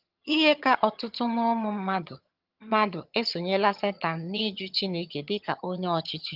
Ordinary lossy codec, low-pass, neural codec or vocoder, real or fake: Opus, 24 kbps; 5.4 kHz; vocoder, 22.05 kHz, 80 mel bands, HiFi-GAN; fake